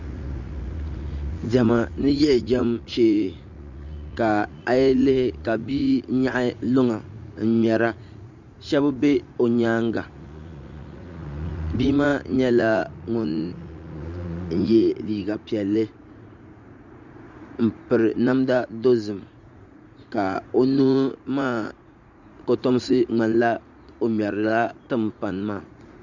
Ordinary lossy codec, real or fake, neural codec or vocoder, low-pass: Opus, 64 kbps; fake; vocoder, 44.1 kHz, 80 mel bands, Vocos; 7.2 kHz